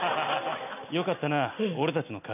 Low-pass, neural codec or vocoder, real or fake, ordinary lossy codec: 3.6 kHz; none; real; none